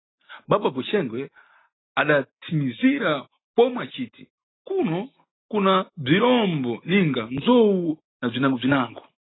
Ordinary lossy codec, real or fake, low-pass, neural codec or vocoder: AAC, 16 kbps; real; 7.2 kHz; none